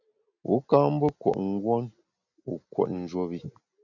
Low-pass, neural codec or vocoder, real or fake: 7.2 kHz; none; real